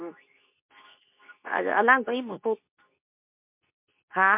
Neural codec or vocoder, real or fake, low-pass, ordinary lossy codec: codec, 24 kHz, 0.9 kbps, WavTokenizer, medium speech release version 2; fake; 3.6 kHz; MP3, 32 kbps